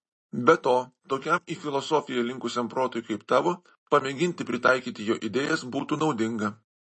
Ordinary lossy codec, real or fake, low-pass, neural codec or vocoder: MP3, 32 kbps; real; 10.8 kHz; none